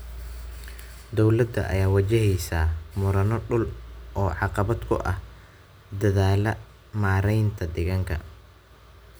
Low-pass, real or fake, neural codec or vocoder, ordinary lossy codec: none; real; none; none